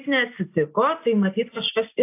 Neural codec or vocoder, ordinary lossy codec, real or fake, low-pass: none; AAC, 24 kbps; real; 3.6 kHz